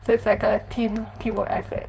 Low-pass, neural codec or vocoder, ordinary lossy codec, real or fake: none; codec, 16 kHz, 4.8 kbps, FACodec; none; fake